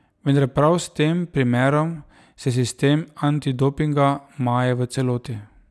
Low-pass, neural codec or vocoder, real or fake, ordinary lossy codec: none; none; real; none